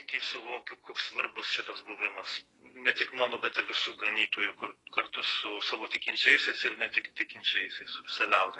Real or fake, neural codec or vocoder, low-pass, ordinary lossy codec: fake; codec, 44.1 kHz, 2.6 kbps, SNAC; 10.8 kHz; AAC, 32 kbps